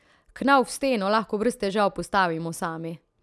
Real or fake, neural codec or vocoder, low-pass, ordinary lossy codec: real; none; none; none